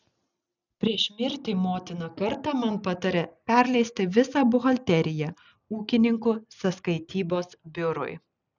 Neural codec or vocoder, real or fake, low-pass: none; real; 7.2 kHz